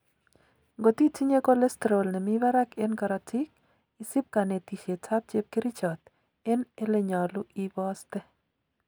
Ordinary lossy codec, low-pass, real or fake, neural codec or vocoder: none; none; real; none